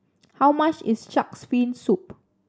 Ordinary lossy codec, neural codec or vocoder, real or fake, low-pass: none; none; real; none